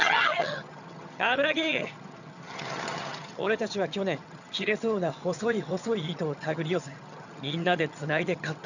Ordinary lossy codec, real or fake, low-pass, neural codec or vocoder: none; fake; 7.2 kHz; vocoder, 22.05 kHz, 80 mel bands, HiFi-GAN